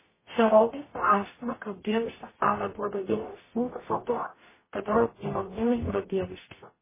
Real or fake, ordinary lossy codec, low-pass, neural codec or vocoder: fake; MP3, 16 kbps; 3.6 kHz; codec, 44.1 kHz, 0.9 kbps, DAC